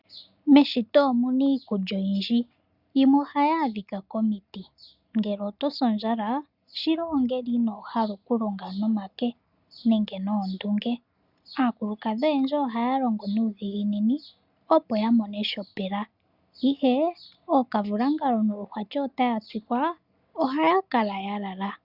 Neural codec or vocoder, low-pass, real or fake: none; 5.4 kHz; real